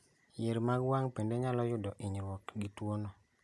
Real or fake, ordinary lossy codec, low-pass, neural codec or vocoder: real; none; none; none